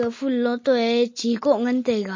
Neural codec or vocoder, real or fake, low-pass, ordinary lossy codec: none; real; 7.2 kHz; MP3, 32 kbps